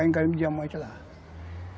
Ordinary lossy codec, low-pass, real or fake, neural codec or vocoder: none; none; real; none